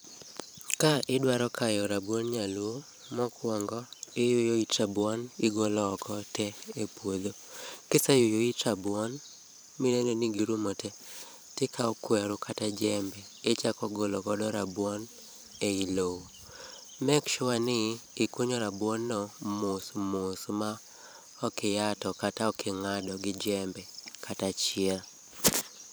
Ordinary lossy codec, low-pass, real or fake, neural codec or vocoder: none; none; fake; vocoder, 44.1 kHz, 128 mel bands every 512 samples, BigVGAN v2